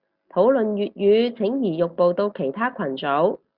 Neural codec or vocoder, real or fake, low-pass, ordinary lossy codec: none; real; 5.4 kHz; Opus, 64 kbps